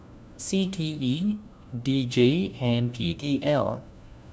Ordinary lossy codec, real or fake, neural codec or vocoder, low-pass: none; fake; codec, 16 kHz, 1 kbps, FunCodec, trained on LibriTTS, 50 frames a second; none